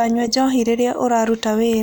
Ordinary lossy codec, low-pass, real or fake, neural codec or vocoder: none; none; real; none